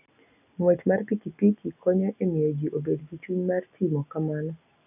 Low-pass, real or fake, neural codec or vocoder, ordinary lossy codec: 3.6 kHz; real; none; none